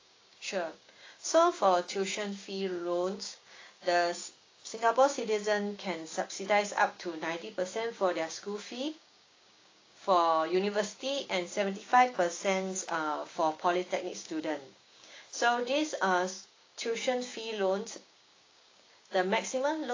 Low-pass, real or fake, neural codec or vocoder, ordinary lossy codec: 7.2 kHz; fake; codec, 16 kHz, 6 kbps, DAC; AAC, 32 kbps